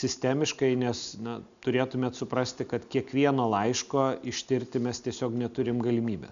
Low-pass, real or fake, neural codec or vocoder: 7.2 kHz; real; none